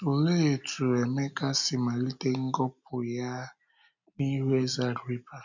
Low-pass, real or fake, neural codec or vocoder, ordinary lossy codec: 7.2 kHz; real; none; none